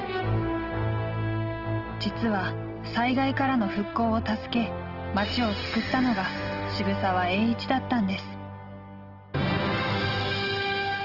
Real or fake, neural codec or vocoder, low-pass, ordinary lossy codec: real; none; 5.4 kHz; Opus, 16 kbps